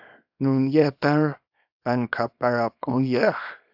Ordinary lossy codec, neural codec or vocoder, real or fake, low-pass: AAC, 48 kbps; codec, 24 kHz, 0.9 kbps, WavTokenizer, small release; fake; 5.4 kHz